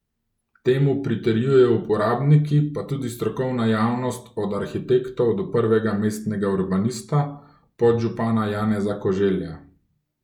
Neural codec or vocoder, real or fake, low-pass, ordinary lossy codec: none; real; 19.8 kHz; none